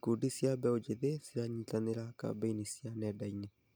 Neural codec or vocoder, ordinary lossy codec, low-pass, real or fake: none; none; none; real